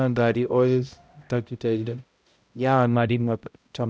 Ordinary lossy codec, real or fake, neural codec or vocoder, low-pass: none; fake; codec, 16 kHz, 0.5 kbps, X-Codec, HuBERT features, trained on balanced general audio; none